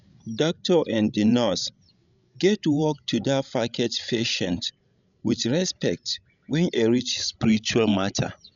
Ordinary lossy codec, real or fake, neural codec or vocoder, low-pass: none; fake; codec, 16 kHz, 16 kbps, FreqCodec, larger model; 7.2 kHz